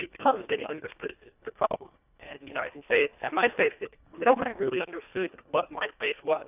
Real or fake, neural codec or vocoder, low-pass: fake; codec, 24 kHz, 1.5 kbps, HILCodec; 3.6 kHz